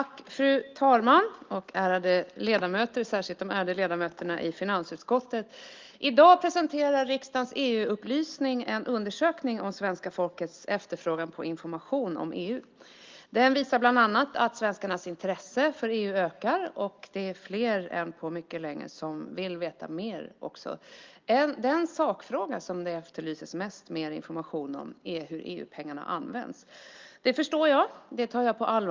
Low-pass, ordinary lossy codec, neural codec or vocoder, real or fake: 7.2 kHz; Opus, 24 kbps; none; real